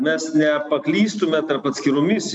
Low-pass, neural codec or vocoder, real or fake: 9.9 kHz; none; real